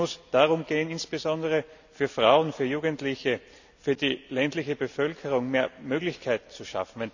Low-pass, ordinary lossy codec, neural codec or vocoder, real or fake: 7.2 kHz; none; none; real